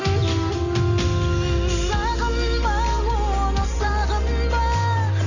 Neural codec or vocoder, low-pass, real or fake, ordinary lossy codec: none; 7.2 kHz; real; none